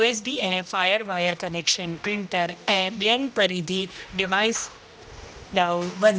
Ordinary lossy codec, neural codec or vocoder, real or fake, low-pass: none; codec, 16 kHz, 1 kbps, X-Codec, HuBERT features, trained on general audio; fake; none